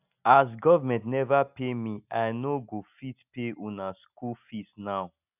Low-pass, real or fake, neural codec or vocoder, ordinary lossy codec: 3.6 kHz; real; none; none